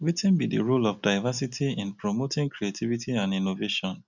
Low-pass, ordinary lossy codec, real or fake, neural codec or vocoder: 7.2 kHz; none; real; none